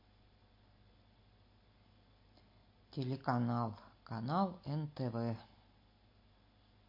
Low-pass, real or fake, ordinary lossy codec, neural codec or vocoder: 5.4 kHz; real; MP3, 32 kbps; none